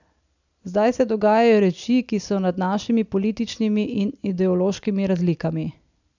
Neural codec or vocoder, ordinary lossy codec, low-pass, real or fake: none; none; 7.2 kHz; real